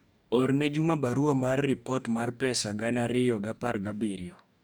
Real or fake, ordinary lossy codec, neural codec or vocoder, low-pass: fake; none; codec, 44.1 kHz, 2.6 kbps, DAC; none